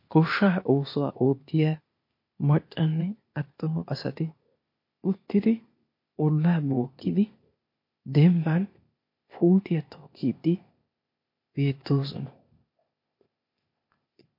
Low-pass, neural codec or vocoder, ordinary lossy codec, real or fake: 5.4 kHz; codec, 16 kHz, 0.8 kbps, ZipCodec; MP3, 32 kbps; fake